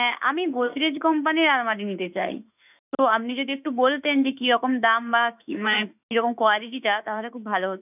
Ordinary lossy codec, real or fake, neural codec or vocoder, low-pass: none; fake; autoencoder, 48 kHz, 32 numbers a frame, DAC-VAE, trained on Japanese speech; 3.6 kHz